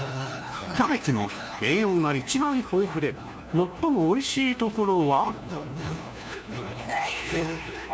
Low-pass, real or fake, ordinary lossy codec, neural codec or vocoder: none; fake; none; codec, 16 kHz, 1 kbps, FunCodec, trained on LibriTTS, 50 frames a second